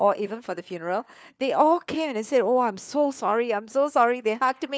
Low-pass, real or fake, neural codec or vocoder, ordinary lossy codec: none; fake; codec, 16 kHz, 4 kbps, FunCodec, trained on LibriTTS, 50 frames a second; none